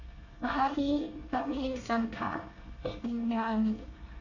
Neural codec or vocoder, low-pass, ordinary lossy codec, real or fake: codec, 24 kHz, 1 kbps, SNAC; 7.2 kHz; none; fake